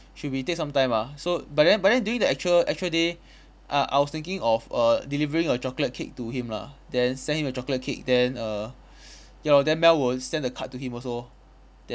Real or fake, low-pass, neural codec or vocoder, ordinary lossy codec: real; none; none; none